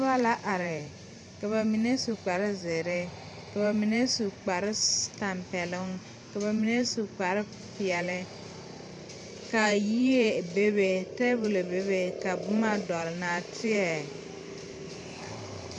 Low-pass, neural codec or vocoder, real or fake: 10.8 kHz; vocoder, 44.1 kHz, 128 mel bands every 512 samples, BigVGAN v2; fake